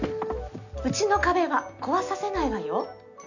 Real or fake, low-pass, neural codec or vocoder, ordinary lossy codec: real; 7.2 kHz; none; none